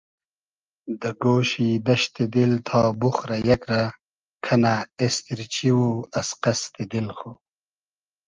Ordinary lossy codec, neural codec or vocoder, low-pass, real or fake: Opus, 24 kbps; none; 7.2 kHz; real